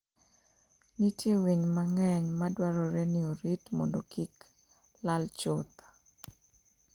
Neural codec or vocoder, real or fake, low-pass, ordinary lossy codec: none; real; 19.8 kHz; Opus, 24 kbps